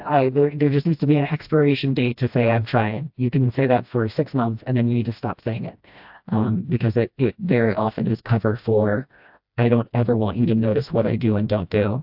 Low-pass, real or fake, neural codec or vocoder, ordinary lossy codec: 5.4 kHz; fake; codec, 16 kHz, 1 kbps, FreqCodec, smaller model; AAC, 48 kbps